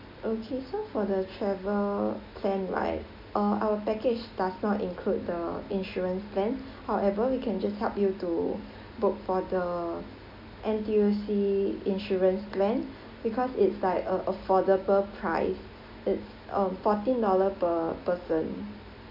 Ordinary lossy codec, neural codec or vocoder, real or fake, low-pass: MP3, 48 kbps; none; real; 5.4 kHz